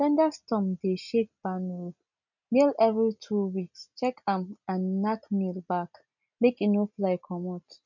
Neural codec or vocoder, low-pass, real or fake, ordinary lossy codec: none; 7.2 kHz; real; none